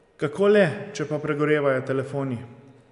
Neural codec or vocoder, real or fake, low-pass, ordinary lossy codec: none; real; 10.8 kHz; none